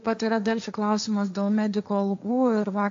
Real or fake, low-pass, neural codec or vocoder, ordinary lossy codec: fake; 7.2 kHz; codec, 16 kHz, 1.1 kbps, Voila-Tokenizer; AAC, 64 kbps